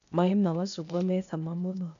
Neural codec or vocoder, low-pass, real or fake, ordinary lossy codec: codec, 16 kHz, 0.8 kbps, ZipCodec; 7.2 kHz; fake; AAC, 96 kbps